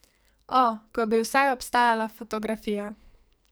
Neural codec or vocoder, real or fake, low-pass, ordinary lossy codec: codec, 44.1 kHz, 2.6 kbps, SNAC; fake; none; none